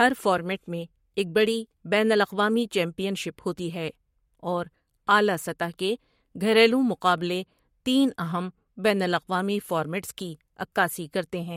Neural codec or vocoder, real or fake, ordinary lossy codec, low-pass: codec, 44.1 kHz, 7.8 kbps, Pupu-Codec; fake; MP3, 64 kbps; 19.8 kHz